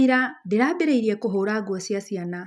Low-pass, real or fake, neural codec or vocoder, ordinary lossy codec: none; real; none; none